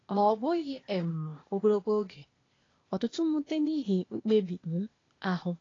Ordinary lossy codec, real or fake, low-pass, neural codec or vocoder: AAC, 32 kbps; fake; 7.2 kHz; codec, 16 kHz, 0.8 kbps, ZipCodec